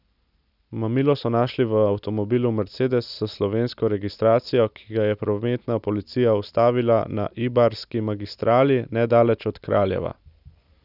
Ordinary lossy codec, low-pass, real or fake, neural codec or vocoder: none; 5.4 kHz; real; none